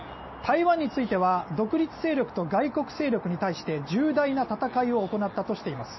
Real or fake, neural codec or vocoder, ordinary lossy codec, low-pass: real; none; MP3, 24 kbps; 7.2 kHz